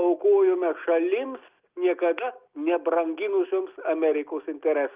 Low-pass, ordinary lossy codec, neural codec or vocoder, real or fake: 3.6 kHz; Opus, 24 kbps; none; real